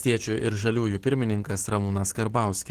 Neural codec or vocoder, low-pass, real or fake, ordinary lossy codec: codec, 44.1 kHz, 7.8 kbps, DAC; 14.4 kHz; fake; Opus, 16 kbps